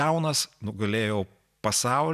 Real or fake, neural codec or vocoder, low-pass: real; none; 14.4 kHz